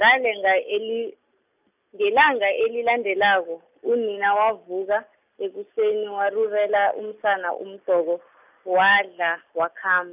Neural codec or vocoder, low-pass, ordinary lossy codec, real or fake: none; 3.6 kHz; none; real